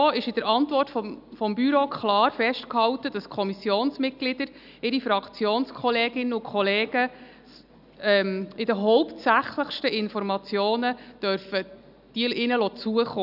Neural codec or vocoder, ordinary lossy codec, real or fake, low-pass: none; none; real; 5.4 kHz